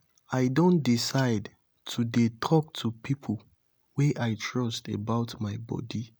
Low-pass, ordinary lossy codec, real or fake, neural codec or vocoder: none; none; real; none